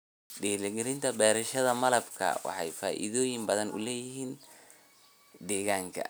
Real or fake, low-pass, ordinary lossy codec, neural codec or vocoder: real; none; none; none